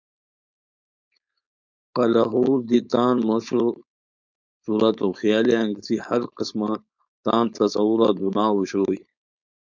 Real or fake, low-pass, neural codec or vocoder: fake; 7.2 kHz; codec, 16 kHz, 4.8 kbps, FACodec